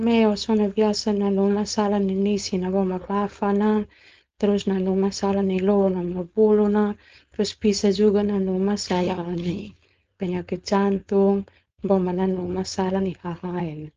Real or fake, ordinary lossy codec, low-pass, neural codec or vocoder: fake; Opus, 24 kbps; 7.2 kHz; codec, 16 kHz, 4.8 kbps, FACodec